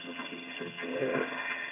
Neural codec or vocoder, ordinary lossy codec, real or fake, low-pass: vocoder, 22.05 kHz, 80 mel bands, HiFi-GAN; none; fake; 3.6 kHz